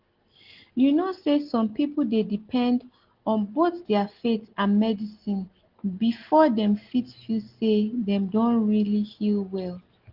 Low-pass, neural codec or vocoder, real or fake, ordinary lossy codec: 5.4 kHz; none; real; Opus, 16 kbps